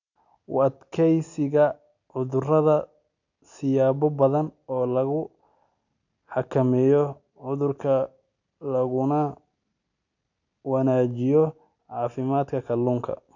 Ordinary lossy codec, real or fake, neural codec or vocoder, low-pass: none; real; none; 7.2 kHz